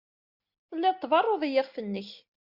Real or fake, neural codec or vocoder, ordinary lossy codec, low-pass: real; none; Opus, 64 kbps; 5.4 kHz